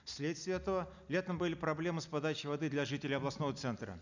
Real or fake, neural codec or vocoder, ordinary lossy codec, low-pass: real; none; none; 7.2 kHz